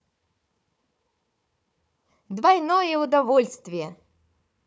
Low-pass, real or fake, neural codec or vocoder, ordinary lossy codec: none; fake; codec, 16 kHz, 4 kbps, FunCodec, trained on Chinese and English, 50 frames a second; none